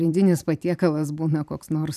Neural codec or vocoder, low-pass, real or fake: vocoder, 44.1 kHz, 128 mel bands every 512 samples, BigVGAN v2; 14.4 kHz; fake